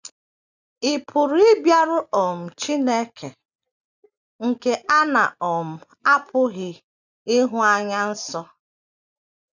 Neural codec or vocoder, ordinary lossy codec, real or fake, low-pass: none; none; real; 7.2 kHz